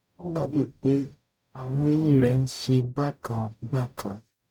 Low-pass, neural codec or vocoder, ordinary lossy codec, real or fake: 19.8 kHz; codec, 44.1 kHz, 0.9 kbps, DAC; none; fake